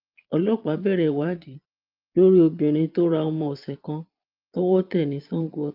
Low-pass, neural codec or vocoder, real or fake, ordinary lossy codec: 5.4 kHz; vocoder, 22.05 kHz, 80 mel bands, Vocos; fake; Opus, 24 kbps